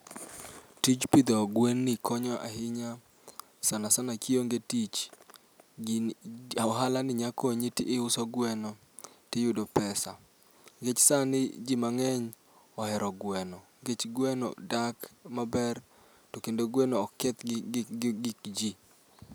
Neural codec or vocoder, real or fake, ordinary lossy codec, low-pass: none; real; none; none